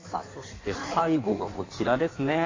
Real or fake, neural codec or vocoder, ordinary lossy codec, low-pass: fake; codec, 16 kHz in and 24 kHz out, 1.1 kbps, FireRedTTS-2 codec; AAC, 32 kbps; 7.2 kHz